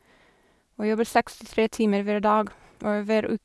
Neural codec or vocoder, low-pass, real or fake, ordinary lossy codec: none; none; real; none